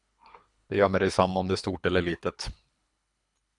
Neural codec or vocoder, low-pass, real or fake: codec, 24 kHz, 3 kbps, HILCodec; 10.8 kHz; fake